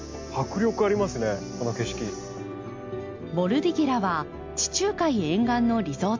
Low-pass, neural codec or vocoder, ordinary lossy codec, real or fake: 7.2 kHz; none; MP3, 48 kbps; real